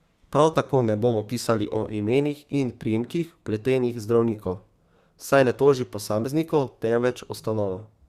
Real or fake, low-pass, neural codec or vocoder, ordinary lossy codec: fake; 14.4 kHz; codec, 32 kHz, 1.9 kbps, SNAC; Opus, 64 kbps